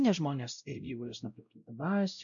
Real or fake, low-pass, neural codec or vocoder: fake; 7.2 kHz; codec, 16 kHz, 0.5 kbps, X-Codec, WavLM features, trained on Multilingual LibriSpeech